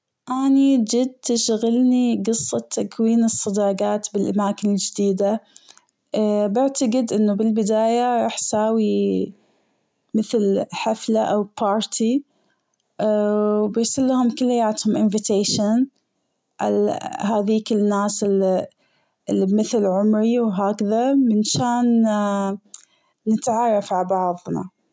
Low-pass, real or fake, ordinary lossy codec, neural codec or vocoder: none; real; none; none